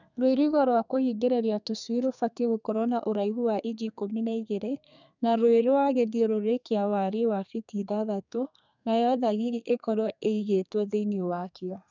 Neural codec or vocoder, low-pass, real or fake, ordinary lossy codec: codec, 32 kHz, 1.9 kbps, SNAC; 7.2 kHz; fake; none